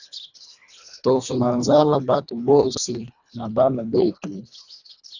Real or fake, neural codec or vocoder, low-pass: fake; codec, 24 kHz, 1.5 kbps, HILCodec; 7.2 kHz